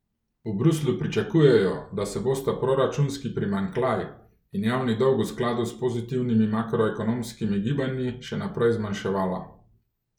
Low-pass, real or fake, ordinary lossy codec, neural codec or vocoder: 19.8 kHz; real; none; none